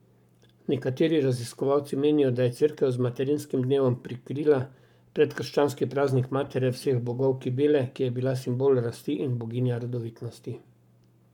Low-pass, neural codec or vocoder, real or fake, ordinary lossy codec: 19.8 kHz; codec, 44.1 kHz, 7.8 kbps, Pupu-Codec; fake; none